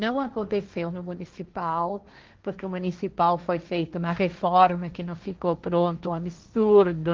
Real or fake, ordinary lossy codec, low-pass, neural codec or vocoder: fake; Opus, 32 kbps; 7.2 kHz; codec, 16 kHz, 1.1 kbps, Voila-Tokenizer